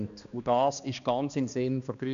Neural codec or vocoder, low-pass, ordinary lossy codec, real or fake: codec, 16 kHz, 2 kbps, X-Codec, HuBERT features, trained on balanced general audio; 7.2 kHz; none; fake